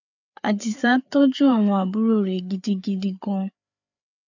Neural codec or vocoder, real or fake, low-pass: codec, 16 kHz, 4 kbps, FreqCodec, larger model; fake; 7.2 kHz